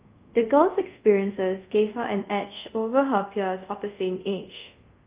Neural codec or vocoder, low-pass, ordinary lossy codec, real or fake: codec, 24 kHz, 0.5 kbps, DualCodec; 3.6 kHz; Opus, 24 kbps; fake